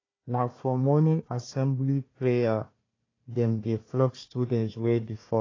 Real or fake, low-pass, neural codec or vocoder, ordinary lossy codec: fake; 7.2 kHz; codec, 16 kHz, 1 kbps, FunCodec, trained on Chinese and English, 50 frames a second; AAC, 32 kbps